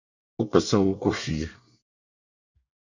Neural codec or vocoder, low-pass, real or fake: codec, 24 kHz, 1 kbps, SNAC; 7.2 kHz; fake